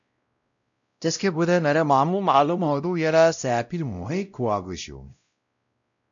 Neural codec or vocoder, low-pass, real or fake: codec, 16 kHz, 0.5 kbps, X-Codec, WavLM features, trained on Multilingual LibriSpeech; 7.2 kHz; fake